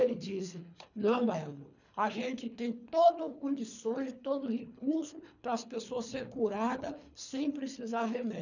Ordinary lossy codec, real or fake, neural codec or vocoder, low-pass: none; fake; codec, 24 kHz, 3 kbps, HILCodec; 7.2 kHz